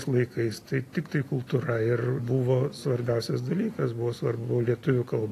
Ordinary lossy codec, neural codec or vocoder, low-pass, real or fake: AAC, 48 kbps; none; 14.4 kHz; real